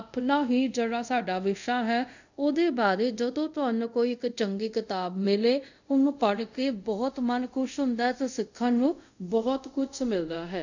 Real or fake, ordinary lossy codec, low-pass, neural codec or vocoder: fake; none; 7.2 kHz; codec, 24 kHz, 0.5 kbps, DualCodec